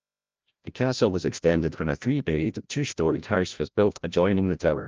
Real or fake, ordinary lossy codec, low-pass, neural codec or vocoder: fake; Opus, 24 kbps; 7.2 kHz; codec, 16 kHz, 0.5 kbps, FreqCodec, larger model